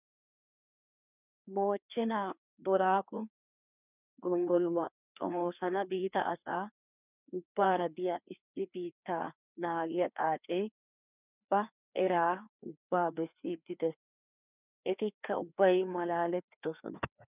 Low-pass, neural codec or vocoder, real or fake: 3.6 kHz; codec, 16 kHz, 2 kbps, FreqCodec, larger model; fake